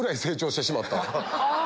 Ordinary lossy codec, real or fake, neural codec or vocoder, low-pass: none; real; none; none